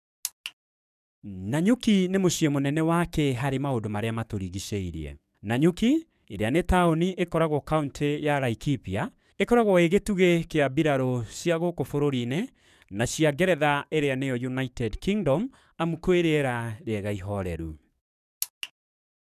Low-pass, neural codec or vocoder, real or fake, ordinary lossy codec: 14.4 kHz; codec, 44.1 kHz, 7.8 kbps, DAC; fake; none